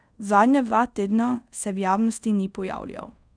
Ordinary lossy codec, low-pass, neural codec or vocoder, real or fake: none; 9.9 kHz; codec, 24 kHz, 0.5 kbps, DualCodec; fake